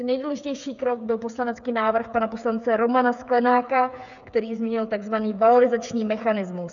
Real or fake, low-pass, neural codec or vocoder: fake; 7.2 kHz; codec, 16 kHz, 8 kbps, FreqCodec, smaller model